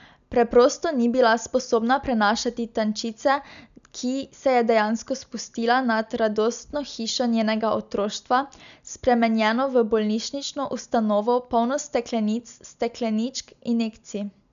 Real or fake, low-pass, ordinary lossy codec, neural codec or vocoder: real; 7.2 kHz; none; none